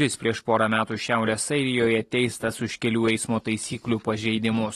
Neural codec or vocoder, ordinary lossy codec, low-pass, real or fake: none; AAC, 32 kbps; 19.8 kHz; real